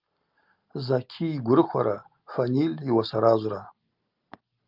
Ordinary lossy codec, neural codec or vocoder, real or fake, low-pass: Opus, 24 kbps; none; real; 5.4 kHz